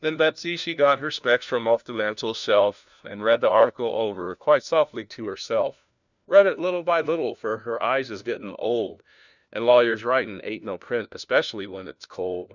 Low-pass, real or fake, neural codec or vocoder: 7.2 kHz; fake; codec, 16 kHz, 1 kbps, FunCodec, trained on LibriTTS, 50 frames a second